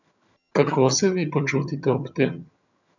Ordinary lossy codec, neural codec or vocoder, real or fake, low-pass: none; vocoder, 22.05 kHz, 80 mel bands, HiFi-GAN; fake; 7.2 kHz